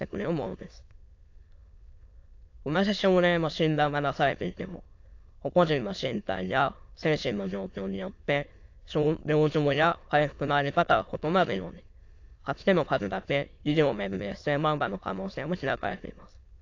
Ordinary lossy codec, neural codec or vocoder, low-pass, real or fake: AAC, 48 kbps; autoencoder, 22.05 kHz, a latent of 192 numbers a frame, VITS, trained on many speakers; 7.2 kHz; fake